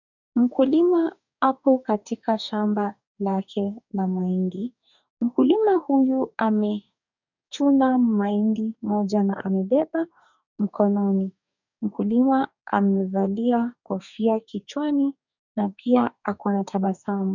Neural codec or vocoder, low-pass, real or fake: codec, 44.1 kHz, 2.6 kbps, DAC; 7.2 kHz; fake